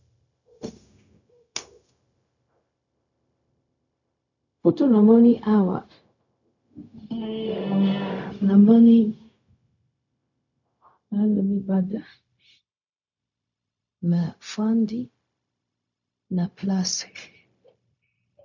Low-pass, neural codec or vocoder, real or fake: 7.2 kHz; codec, 16 kHz, 0.4 kbps, LongCat-Audio-Codec; fake